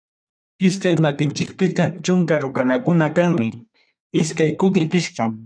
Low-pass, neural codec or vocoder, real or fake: 9.9 kHz; codec, 24 kHz, 1 kbps, SNAC; fake